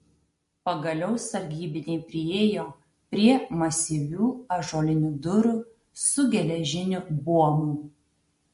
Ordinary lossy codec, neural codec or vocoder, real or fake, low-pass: MP3, 48 kbps; none; real; 14.4 kHz